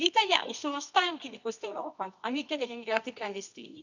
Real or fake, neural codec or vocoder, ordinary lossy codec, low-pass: fake; codec, 24 kHz, 0.9 kbps, WavTokenizer, medium music audio release; none; 7.2 kHz